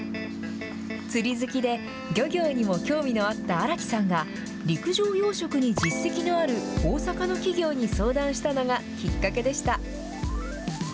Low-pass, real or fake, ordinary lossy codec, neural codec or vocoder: none; real; none; none